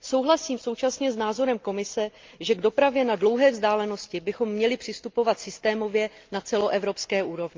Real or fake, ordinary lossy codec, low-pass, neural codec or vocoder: real; Opus, 24 kbps; 7.2 kHz; none